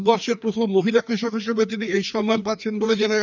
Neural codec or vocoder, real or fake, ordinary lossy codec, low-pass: codec, 16 kHz, 2 kbps, FreqCodec, larger model; fake; none; 7.2 kHz